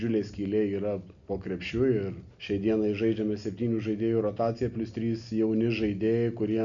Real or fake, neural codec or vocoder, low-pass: real; none; 7.2 kHz